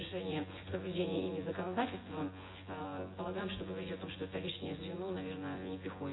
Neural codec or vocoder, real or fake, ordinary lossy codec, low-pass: vocoder, 24 kHz, 100 mel bands, Vocos; fake; AAC, 16 kbps; 7.2 kHz